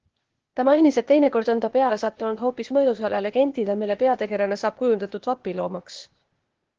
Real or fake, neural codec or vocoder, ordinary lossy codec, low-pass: fake; codec, 16 kHz, 0.8 kbps, ZipCodec; Opus, 16 kbps; 7.2 kHz